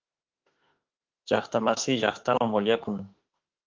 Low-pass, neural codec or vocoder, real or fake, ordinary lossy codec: 7.2 kHz; autoencoder, 48 kHz, 32 numbers a frame, DAC-VAE, trained on Japanese speech; fake; Opus, 32 kbps